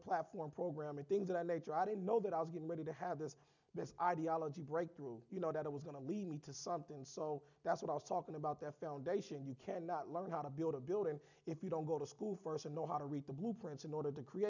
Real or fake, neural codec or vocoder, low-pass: real; none; 7.2 kHz